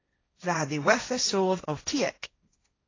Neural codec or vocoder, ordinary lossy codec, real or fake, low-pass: codec, 16 kHz, 1.1 kbps, Voila-Tokenizer; AAC, 32 kbps; fake; 7.2 kHz